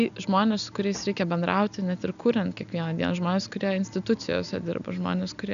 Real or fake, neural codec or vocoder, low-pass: real; none; 7.2 kHz